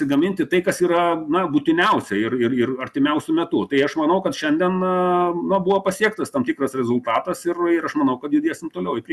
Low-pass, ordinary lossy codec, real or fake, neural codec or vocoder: 14.4 kHz; Opus, 64 kbps; real; none